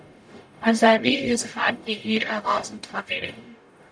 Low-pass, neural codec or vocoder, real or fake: 9.9 kHz; codec, 44.1 kHz, 0.9 kbps, DAC; fake